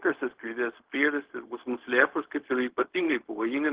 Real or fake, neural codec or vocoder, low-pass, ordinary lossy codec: fake; codec, 16 kHz, 0.4 kbps, LongCat-Audio-Codec; 3.6 kHz; Opus, 16 kbps